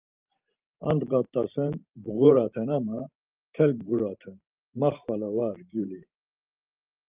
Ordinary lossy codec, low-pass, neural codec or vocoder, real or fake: Opus, 24 kbps; 3.6 kHz; vocoder, 44.1 kHz, 128 mel bands every 512 samples, BigVGAN v2; fake